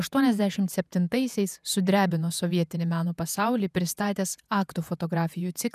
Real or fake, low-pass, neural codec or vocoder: fake; 14.4 kHz; vocoder, 48 kHz, 128 mel bands, Vocos